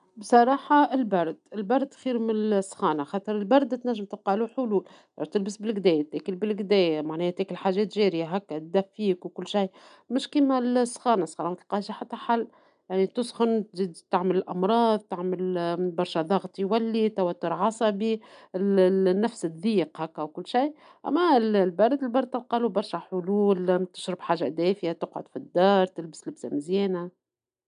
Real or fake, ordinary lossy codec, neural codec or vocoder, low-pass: real; none; none; 9.9 kHz